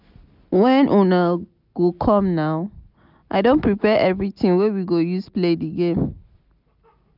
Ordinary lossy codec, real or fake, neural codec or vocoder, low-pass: none; real; none; 5.4 kHz